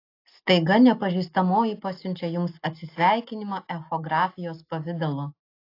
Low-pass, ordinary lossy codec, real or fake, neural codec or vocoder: 5.4 kHz; AAC, 32 kbps; real; none